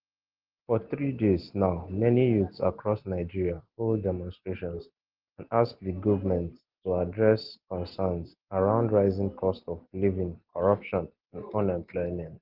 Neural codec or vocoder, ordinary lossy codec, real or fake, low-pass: none; Opus, 16 kbps; real; 5.4 kHz